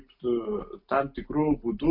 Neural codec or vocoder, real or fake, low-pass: none; real; 5.4 kHz